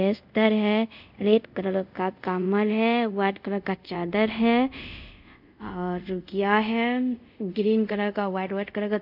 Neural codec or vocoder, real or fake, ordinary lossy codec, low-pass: codec, 24 kHz, 0.5 kbps, DualCodec; fake; none; 5.4 kHz